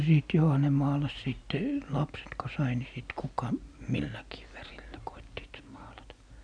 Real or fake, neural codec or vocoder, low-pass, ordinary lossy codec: real; none; 9.9 kHz; none